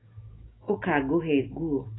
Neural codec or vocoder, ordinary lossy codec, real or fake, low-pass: autoencoder, 48 kHz, 128 numbers a frame, DAC-VAE, trained on Japanese speech; AAC, 16 kbps; fake; 7.2 kHz